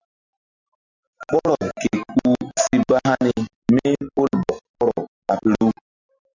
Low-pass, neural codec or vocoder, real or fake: 7.2 kHz; none; real